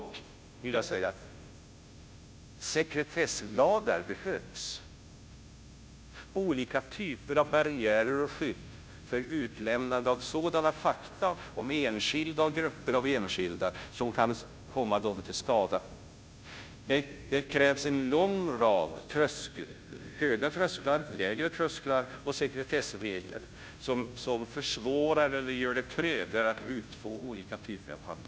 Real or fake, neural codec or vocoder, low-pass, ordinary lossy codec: fake; codec, 16 kHz, 0.5 kbps, FunCodec, trained on Chinese and English, 25 frames a second; none; none